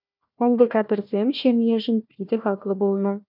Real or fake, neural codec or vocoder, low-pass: fake; codec, 16 kHz, 1 kbps, FunCodec, trained on Chinese and English, 50 frames a second; 5.4 kHz